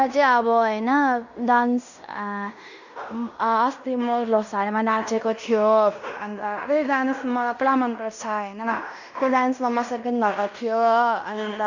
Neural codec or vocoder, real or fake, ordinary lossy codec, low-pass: codec, 16 kHz in and 24 kHz out, 0.9 kbps, LongCat-Audio-Codec, fine tuned four codebook decoder; fake; none; 7.2 kHz